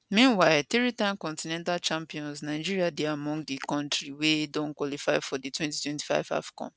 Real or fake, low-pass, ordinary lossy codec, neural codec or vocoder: real; none; none; none